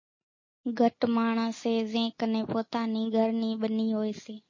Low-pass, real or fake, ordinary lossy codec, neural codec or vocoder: 7.2 kHz; real; MP3, 32 kbps; none